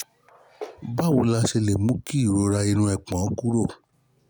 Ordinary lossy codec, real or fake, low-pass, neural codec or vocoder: none; real; none; none